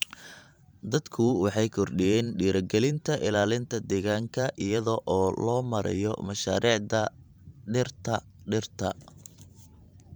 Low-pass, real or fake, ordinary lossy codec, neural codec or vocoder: none; fake; none; vocoder, 44.1 kHz, 128 mel bands every 512 samples, BigVGAN v2